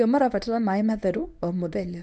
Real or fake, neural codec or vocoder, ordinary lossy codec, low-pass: fake; codec, 24 kHz, 0.9 kbps, WavTokenizer, medium speech release version 1; none; none